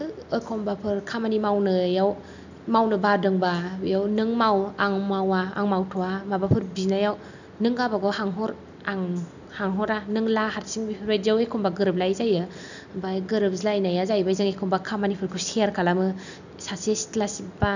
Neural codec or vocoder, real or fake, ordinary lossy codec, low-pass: none; real; none; 7.2 kHz